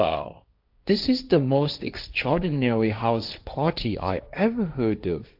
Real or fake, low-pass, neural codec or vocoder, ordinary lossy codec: fake; 5.4 kHz; codec, 16 kHz, 1.1 kbps, Voila-Tokenizer; AAC, 48 kbps